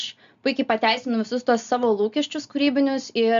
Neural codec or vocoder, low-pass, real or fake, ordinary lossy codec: none; 7.2 kHz; real; MP3, 64 kbps